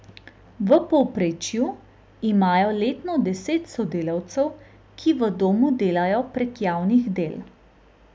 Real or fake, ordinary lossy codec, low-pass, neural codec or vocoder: real; none; none; none